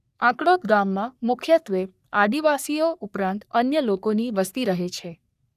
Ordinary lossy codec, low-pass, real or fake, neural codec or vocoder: none; 14.4 kHz; fake; codec, 44.1 kHz, 3.4 kbps, Pupu-Codec